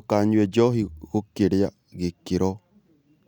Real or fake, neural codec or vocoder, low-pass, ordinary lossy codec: real; none; 19.8 kHz; none